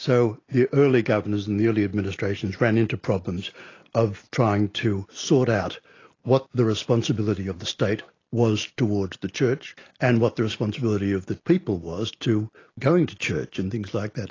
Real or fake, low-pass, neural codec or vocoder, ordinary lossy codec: real; 7.2 kHz; none; AAC, 32 kbps